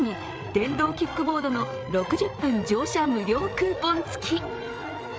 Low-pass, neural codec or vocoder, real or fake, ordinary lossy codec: none; codec, 16 kHz, 8 kbps, FreqCodec, larger model; fake; none